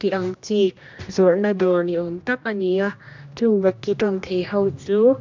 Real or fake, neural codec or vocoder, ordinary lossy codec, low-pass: fake; codec, 16 kHz, 1 kbps, X-Codec, HuBERT features, trained on general audio; MP3, 64 kbps; 7.2 kHz